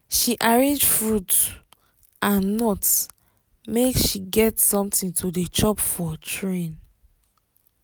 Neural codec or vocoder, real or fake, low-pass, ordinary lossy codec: none; real; none; none